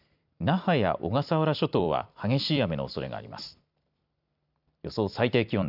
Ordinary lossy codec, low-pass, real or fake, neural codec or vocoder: none; 5.4 kHz; fake; vocoder, 44.1 kHz, 128 mel bands every 256 samples, BigVGAN v2